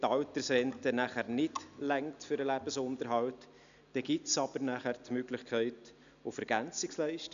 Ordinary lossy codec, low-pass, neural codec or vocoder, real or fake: none; 7.2 kHz; none; real